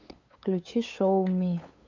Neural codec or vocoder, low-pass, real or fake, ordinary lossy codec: codec, 16 kHz, 8 kbps, FunCodec, trained on LibriTTS, 25 frames a second; 7.2 kHz; fake; MP3, 64 kbps